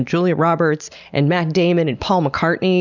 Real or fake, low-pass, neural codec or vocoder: real; 7.2 kHz; none